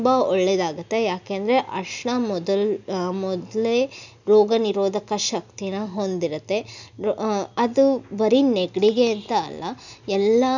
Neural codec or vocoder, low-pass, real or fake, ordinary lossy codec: none; 7.2 kHz; real; none